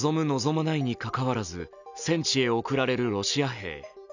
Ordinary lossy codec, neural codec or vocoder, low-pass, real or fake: none; none; 7.2 kHz; real